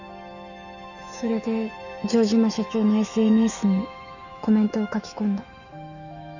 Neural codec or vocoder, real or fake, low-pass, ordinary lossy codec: autoencoder, 48 kHz, 128 numbers a frame, DAC-VAE, trained on Japanese speech; fake; 7.2 kHz; none